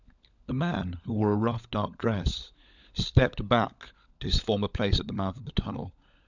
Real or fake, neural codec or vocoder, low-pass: fake; codec, 16 kHz, 16 kbps, FunCodec, trained on LibriTTS, 50 frames a second; 7.2 kHz